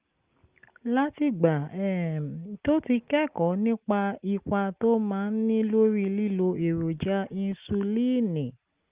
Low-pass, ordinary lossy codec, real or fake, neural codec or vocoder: 3.6 kHz; Opus, 32 kbps; real; none